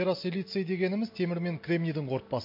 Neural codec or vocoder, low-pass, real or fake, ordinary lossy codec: none; 5.4 kHz; real; MP3, 32 kbps